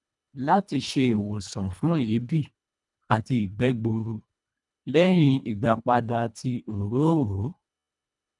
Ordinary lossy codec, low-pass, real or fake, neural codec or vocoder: none; 10.8 kHz; fake; codec, 24 kHz, 1.5 kbps, HILCodec